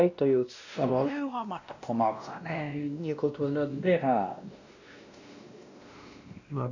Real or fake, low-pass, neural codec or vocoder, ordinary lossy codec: fake; 7.2 kHz; codec, 16 kHz, 1 kbps, X-Codec, WavLM features, trained on Multilingual LibriSpeech; none